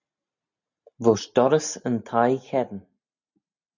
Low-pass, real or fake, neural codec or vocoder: 7.2 kHz; real; none